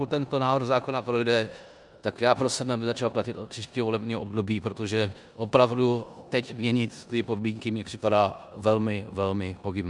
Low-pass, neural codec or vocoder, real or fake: 10.8 kHz; codec, 16 kHz in and 24 kHz out, 0.9 kbps, LongCat-Audio-Codec, four codebook decoder; fake